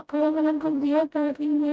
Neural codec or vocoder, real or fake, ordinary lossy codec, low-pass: codec, 16 kHz, 0.5 kbps, FreqCodec, smaller model; fake; none; none